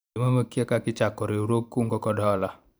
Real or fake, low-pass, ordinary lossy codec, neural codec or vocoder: fake; none; none; vocoder, 44.1 kHz, 128 mel bands every 512 samples, BigVGAN v2